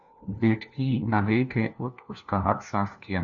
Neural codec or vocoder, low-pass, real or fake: codec, 16 kHz, 1 kbps, FunCodec, trained on LibriTTS, 50 frames a second; 7.2 kHz; fake